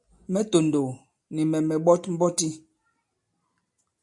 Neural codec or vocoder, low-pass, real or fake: none; 10.8 kHz; real